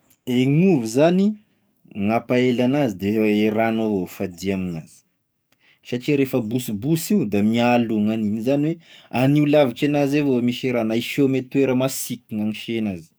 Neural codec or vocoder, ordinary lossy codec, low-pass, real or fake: codec, 44.1 kHz, 7.8 kbps, DAC; none; none; fake